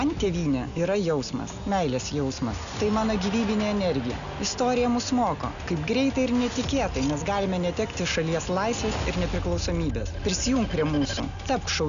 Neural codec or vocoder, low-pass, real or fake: none; 7.2 kHz; real